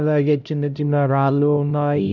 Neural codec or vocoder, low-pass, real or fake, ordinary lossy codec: codec, 16 kHz, 0.5 kbps, X-Codec, HuBERT features, trained on LibriSpeech; 7.2 kHz; fake; none